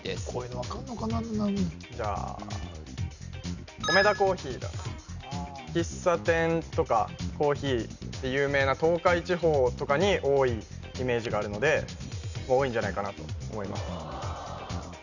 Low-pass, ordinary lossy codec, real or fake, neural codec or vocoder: 7.2 kHz; none; real; none